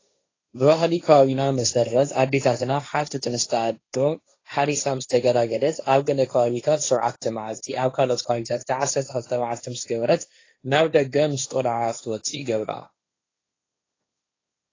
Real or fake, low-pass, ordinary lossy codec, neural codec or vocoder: fake; 7.2 kHz; AAC, 32 kbps; codec, 16 kHz, 1.1 kbps, Voila-Tokenizer